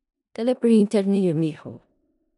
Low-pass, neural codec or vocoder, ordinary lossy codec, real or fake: 10.8 kHz; codec, 16 kHz in and 24 kHz out, 0.4 kbps, LongCat-Audio-Codec, four codebook decoder; none; fake